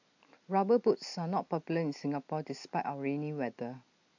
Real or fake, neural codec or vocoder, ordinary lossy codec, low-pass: real; none; AAC, 48 kbps; 7.2 kHz